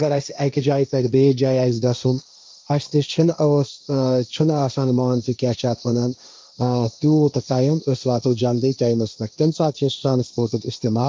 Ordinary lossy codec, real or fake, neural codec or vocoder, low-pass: none; fake; codec, 16 kHz, 1.1 kbps, Voila-Tokenizer; none